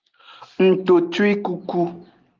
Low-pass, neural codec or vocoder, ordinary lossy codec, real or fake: 7.2 kHz; none; Opus, 32 kbps; real